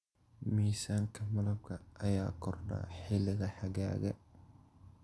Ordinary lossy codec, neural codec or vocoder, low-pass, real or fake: none; none; none; real